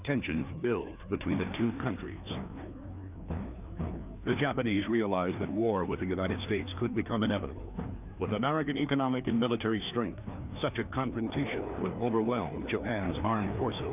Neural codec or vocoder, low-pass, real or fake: codec, 16 kHz, 2 kbps, FreqCodec, larger model; 3.6 kHz; fake